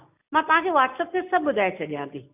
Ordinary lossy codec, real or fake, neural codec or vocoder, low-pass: none; real; none; 3.6 kHz